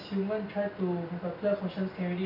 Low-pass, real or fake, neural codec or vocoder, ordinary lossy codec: 5.4 kHz; real; none; none